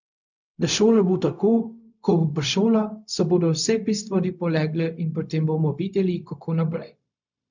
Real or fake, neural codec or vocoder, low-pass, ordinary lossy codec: fake; codec, 16 kHz, 0.4 kbps, LongCat-Audio-Codec; 7.2 kHz; none